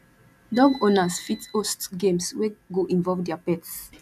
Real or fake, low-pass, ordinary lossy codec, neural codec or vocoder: real; 14.4 kHz; none; none